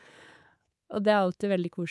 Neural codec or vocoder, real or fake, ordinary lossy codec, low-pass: codec, 24 kHz, 3.1 kbps, DualCodec; fake; none; none